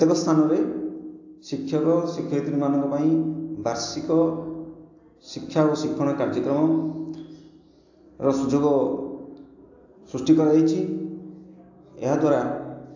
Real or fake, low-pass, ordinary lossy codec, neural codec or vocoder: real; 7.2 kHz; AAC, 48 kbps; none